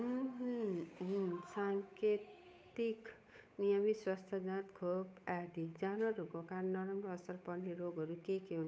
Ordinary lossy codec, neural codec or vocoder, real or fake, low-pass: none; codec, 16 kHz, 8 kbps, FunCodec, trained on Chinese and English, 25 frames a second; fake; none